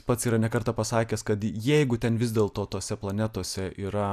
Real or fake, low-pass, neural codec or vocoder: real; 14.4 kHz; none